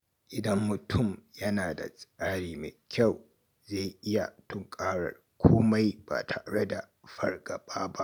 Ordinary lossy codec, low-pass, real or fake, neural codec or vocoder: none; none; real; none